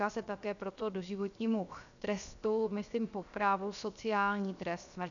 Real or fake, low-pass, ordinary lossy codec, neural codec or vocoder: fake; 7.2 kHz; MP3, 96 kbps; codec, 16 kHz, 0.7 kbps, FocalCodec